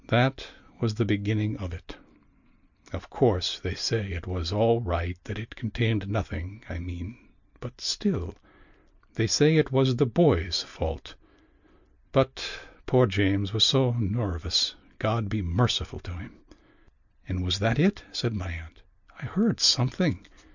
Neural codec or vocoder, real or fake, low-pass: none; real; 7.2 kHz